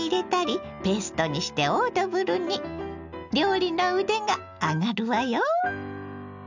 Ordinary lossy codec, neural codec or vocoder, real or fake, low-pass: none; none; real; 7.2 kHz